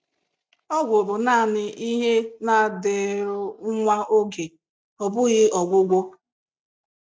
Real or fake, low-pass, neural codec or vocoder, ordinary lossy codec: real; none; none; none